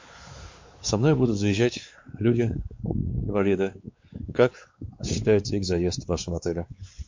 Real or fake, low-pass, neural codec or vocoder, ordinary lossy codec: fake; 7.2 kHz; codec, 16 kHz, 4 kbps, X-Codec, WavLM features, trained on Multilingual LibriSpeech; MP3, 48 kbps